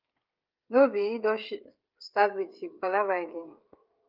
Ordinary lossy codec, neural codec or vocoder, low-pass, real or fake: Opus, 24 kbps; codec, 16 kHz, 16 kbps, FreqCodec, smaller model; 5.4 kHz; fake